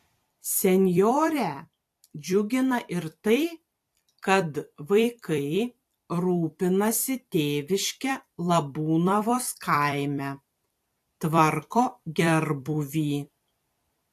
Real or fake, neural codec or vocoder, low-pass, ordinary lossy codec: fake; vocoder, 44.1 kHz, 128 mel bands every 256 samples, BigVGAN v2; 14.4 kHz; AAC, 64 kbps